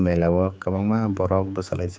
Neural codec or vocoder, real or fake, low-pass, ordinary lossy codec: codec, 16 kHz, 4 kbps, X-Codec, HuBERT features, trained on general audio; fake; none; none